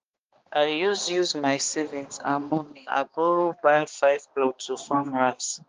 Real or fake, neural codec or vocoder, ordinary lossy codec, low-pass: fake; codec, 16 kHz, 2 kbps, X-Codec, HuBERT features, trained on balanced general audio; Opus, 32 kbps; 7.2 kHz